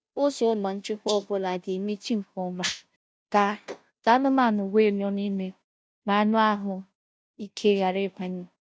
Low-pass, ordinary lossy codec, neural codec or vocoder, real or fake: none; none; codec, 16 kHz, 0.5 kbps, FunCodec, trained on Chinese and English, 25 frames a second; fake